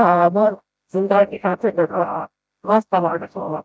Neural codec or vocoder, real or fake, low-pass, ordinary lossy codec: codec, 16 kHz, 0.5 kbps, FreqCodec, smaller model; fake; none; none